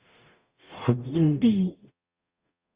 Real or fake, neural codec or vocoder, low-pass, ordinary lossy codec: fake; codec, 44.1 kHz, 0.9 kbps, DAC; 3.6 kHz; Opus, 64 kbps